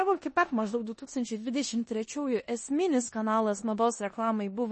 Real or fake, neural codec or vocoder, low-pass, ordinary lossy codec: fake; codec, 16 kHz in and 24 kHz out, 0.9 kbps, LongCat-Audio-Codec, four codebook decoder; 10.8 kHz; MP3, 32 kbps